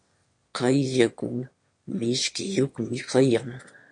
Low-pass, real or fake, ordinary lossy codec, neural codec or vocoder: 9.9 kHz; fake; MP3, 48 kbps; autoencoder, 22.05 kHz, a latent of 192 numbers a frame, VITS, trained on one speaker